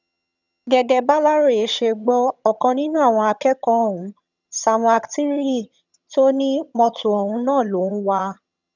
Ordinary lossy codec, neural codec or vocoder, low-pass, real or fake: none; vocoder, 22.05 kHz, 80 mel bands, HiFi-GAN; 7.2 kHz; fake